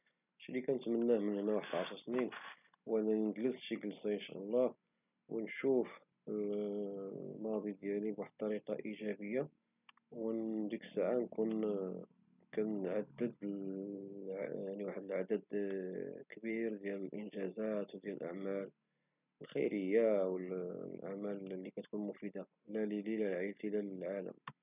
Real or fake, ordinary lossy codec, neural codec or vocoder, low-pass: real; none; none; 3.6 kHz